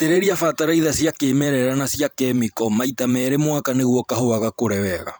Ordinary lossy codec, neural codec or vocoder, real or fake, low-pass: none; vocoder, 44.1 kHz, 128 mel bands every 512 samples, BigVGAN v2; fake; none